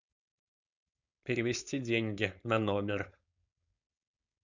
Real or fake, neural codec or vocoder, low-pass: fake; codec, 16 kHz, 4.8 kbps, FACodec; 7.2 kHz